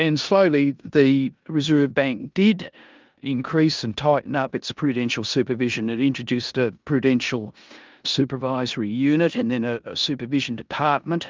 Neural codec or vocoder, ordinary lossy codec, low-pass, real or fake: codec, 16 kHz in and 24 kHz out, 0.9 kbps, LongCat-Audio-Codec, four codebook decoder; Opus, 24 kbps; 7.2 kHz; fake